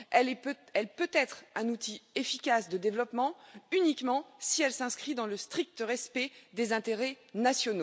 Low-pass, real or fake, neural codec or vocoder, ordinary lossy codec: none; real; none; none